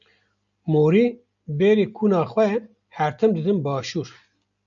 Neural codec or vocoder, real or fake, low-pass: none; real; 7.2 kHz